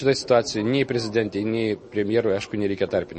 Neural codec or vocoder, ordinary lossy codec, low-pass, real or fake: none; MP3, 32 kbps; 10.8 kHz; real